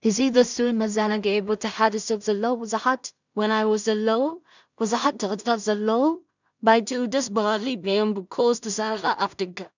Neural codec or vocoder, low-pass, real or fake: codec, 16 kHz in and 24 kHz out, 0.4 kbps, LongCat-Audio-Codec, two codebook decoder; 7.2 kHz; fake